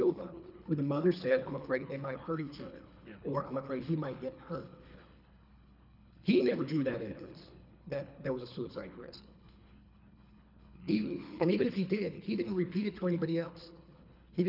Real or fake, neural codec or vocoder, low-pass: fake; codec, 24 kHz, 3 kbps, HILCodec; 5.4 kHz